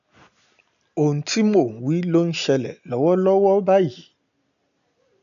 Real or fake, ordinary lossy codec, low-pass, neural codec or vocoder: real; none; 7.2 kHz; none